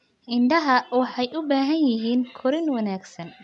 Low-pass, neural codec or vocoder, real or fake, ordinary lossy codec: 10.8 kHz; none; real; none